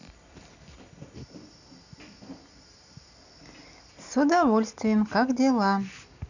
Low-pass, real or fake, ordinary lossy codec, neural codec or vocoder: 7.2 kHz; real; none; none